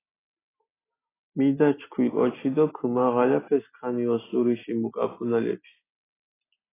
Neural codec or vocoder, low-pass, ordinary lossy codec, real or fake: none; 3.6 kHz; AAC, 16 kbps; real